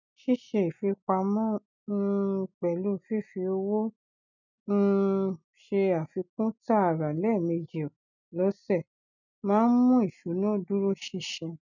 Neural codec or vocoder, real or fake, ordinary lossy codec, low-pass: none; real; none; 7.2 kHz